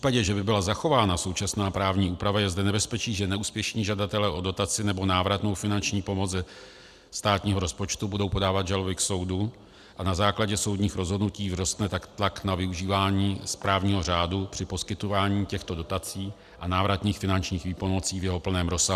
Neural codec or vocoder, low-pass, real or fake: none; 14.4 kHz; real